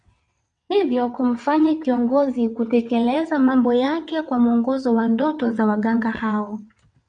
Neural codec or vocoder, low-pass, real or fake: vocoder, 22.05 kHz, 80 mel bands, WaveNeXt; 9.9 kHz; fake